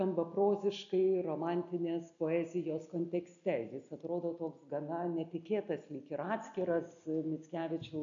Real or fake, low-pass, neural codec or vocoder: real; 7.2 kHz; none